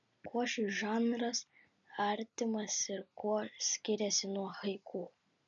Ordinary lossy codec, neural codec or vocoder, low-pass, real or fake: MP3, 96 kbps; none; 7.2 kHz; real